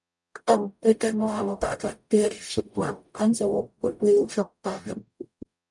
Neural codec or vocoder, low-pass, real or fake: codec, 44.1 kHz, 0.9 kbps, DAC; 10.8 kHz; fake